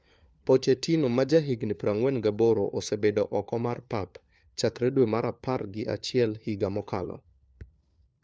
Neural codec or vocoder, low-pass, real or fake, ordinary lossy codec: codec, 16 kHz, 4 kbps, FunCodec, trained on LibriTTS, 50 frames a second; none; fake; none